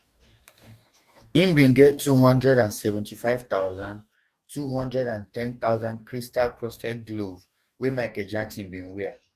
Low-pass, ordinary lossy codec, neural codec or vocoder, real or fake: 14.4 kHz; Opus, 64 kbps; codec, 44.1 kHz, 2.6 kbps, DAC; fake